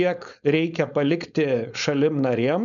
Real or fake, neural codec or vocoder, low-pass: fake; codec, 16 kHz, 4.8 kbps, FACodec; 7.2 kHz